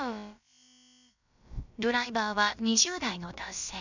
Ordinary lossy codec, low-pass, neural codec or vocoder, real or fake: none; 7.2 kHz; codec, 16 kHz, about 1 kbps, DyCAST, with the encoder's durations; fake